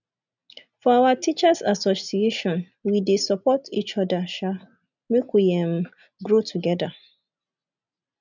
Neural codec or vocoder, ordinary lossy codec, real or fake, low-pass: none; none; real; 7.2 kHz